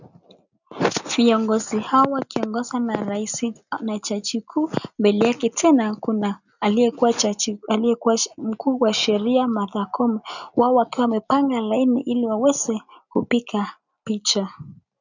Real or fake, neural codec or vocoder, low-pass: real; none; 7.2 kHz